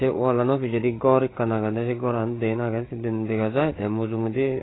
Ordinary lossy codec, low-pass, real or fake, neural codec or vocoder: AAC, 16 kbps; 7.2 kHz; fake; codec, 16 kHz, 8 kbps, FreqCodec, larger model